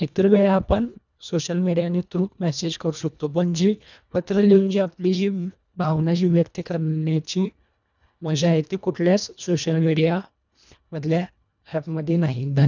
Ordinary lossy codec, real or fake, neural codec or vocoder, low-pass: none; fake; codec, 24 kHz, 1.5 kbps, HILCodec; 7.2 kHz